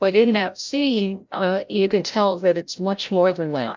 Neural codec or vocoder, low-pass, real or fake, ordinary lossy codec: codec, 16 kHz, 0.5 kbps, FreqCodec, larger model; 7.2 kHz; fake; MP3, 64 kbps